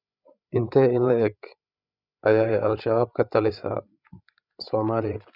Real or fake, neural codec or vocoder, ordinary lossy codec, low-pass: fake; codec, 16 kHz, 8 kbps, FreqCodec, larger model; none; 5.4 kHz